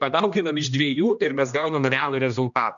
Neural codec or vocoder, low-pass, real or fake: codec, 16 kHz, 1 kbps, X-Codec, HuBERT features, trained on general audio; 7.2 kHz; fake